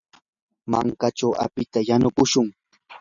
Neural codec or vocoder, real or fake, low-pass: none; real; 7.2 kHz